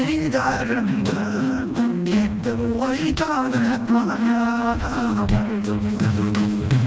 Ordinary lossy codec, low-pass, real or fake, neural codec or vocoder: none; none; fake; codec, 16 kHz, 1 kbps, FreqCodec, smaller model